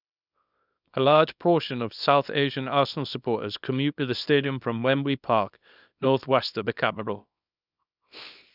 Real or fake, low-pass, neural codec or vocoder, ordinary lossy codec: fake; 5.4 kHz; codec, 24 kHz, 0.9 kbps, WavTokenizer, small release; none